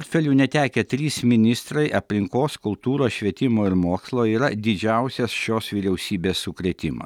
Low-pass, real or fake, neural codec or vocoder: 19.8 kHz; real; none